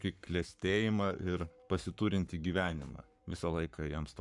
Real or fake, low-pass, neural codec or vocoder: fake; 10.8 kHz; codec, 44.1 kHz, 7.8 kbps, Pupu-Codec